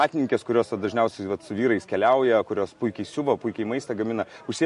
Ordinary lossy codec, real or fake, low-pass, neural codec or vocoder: MP3, 48 kbps; real; 14.4 kHz; none